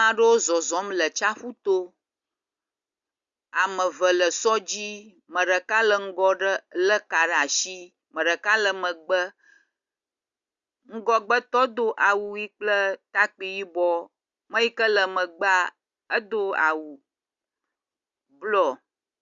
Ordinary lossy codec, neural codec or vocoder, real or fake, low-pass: Opus, 64 kbps; none; real; 7.2 kHz